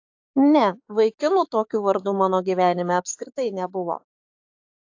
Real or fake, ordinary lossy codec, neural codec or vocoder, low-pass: fake; AAC, 48 kbps; codec, 16 kHz, 6 kbps, DAC; 7.2 kHz